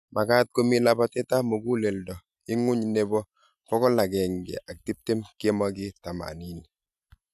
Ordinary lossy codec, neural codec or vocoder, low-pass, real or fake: none; none; 14.4 kHz; real